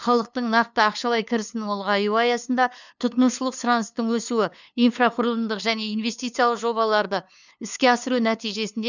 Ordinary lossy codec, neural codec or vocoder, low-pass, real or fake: none; codec, 16 kHz, 2 kbps, FunCodec, trained on LibriTTS, 25 frames a second; 7.2 kHz; fake